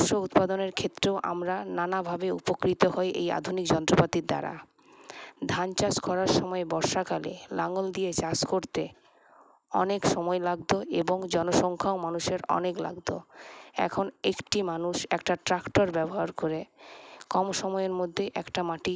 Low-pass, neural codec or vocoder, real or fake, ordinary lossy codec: none; none; real; none